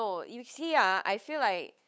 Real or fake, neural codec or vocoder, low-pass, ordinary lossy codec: fake; codec, 16 kHz, 8 kbps, FunCodec, trained on Chinese and English, 25 frames a second; none; none